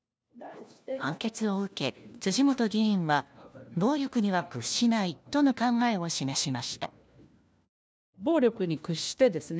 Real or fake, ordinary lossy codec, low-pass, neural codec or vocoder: fake; none; none; codec, 16 kHz, 1 kbps, FunCodec, trained on LibriTTS, 50 frames a second